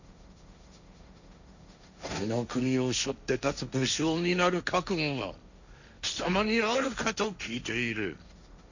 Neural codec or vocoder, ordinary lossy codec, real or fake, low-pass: codec, 16 kHz, 1.1 kbps, Voila-Tokenizer; none; fake; 7.2 kHz